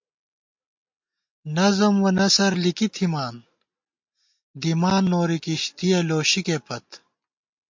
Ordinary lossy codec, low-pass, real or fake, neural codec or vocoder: MP3, 48 kbps; 7.2 kHz; real; none